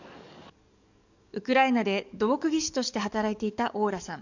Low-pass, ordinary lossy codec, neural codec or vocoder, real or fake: 7.2 kHz; none; codec, 44.1 kHz, 7.8 kbps, DAC; fake